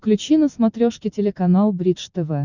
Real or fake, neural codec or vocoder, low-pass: real; none; 7.2 kHz